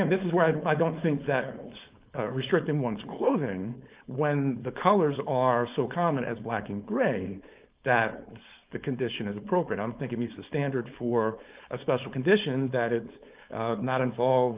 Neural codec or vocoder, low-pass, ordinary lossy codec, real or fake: codec, 16 kHz, 4.8 kbps, FACodec; 3.6 kHz; Opus, 24 kbps; fake